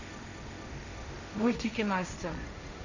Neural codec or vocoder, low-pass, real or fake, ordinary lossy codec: codec, 16 kHz, 1.1 kbps, Voila-Tokenizer; 7.2 kHz; fake; none